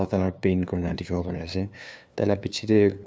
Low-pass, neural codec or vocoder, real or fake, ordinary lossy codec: none; codec, 16 kHz, 2 kbps, FunCodec, trained on LibriTTS, 25 frames a second; fake; none